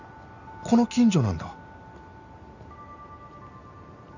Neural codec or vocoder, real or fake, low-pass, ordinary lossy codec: none; real; 7.2 kHz; none